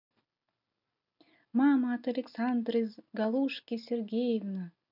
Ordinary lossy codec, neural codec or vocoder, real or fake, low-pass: none; none; real; 5.4 kHz